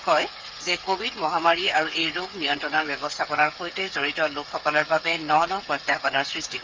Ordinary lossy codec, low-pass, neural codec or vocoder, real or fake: Opus, 32 kbps; 7.2 kHz; codec, 16 kHz, 8 kbps, FreqCodec, smaller model; fake